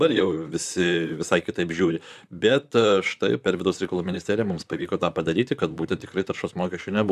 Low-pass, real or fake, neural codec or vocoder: 14.4 kHz; fake; vocoder, 44.1 kHz, 128 mel bands, Pupu-Vocoder